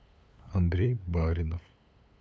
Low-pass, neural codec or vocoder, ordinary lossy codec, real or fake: none; codec, 16 kHz, 8 kbps, FunCodec, trained on LibriTTS, 25 frames a second; none; fake